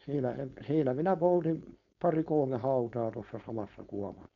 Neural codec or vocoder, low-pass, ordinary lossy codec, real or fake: codec, 16 kHz, 4.8 kbps, FACodec; 7.2 kHz; MP3, 96 kbps; fake